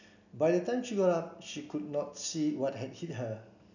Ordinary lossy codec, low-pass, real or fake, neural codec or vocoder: none; 7.2 kHz; real; none